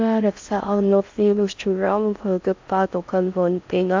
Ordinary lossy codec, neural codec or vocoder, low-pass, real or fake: MP3, 64 kbps; codec, 16 kHz in and 24 kHz out, 0.6 kbps, FocalCodec, streaming, 4096 codes; 7.2 kHz; fake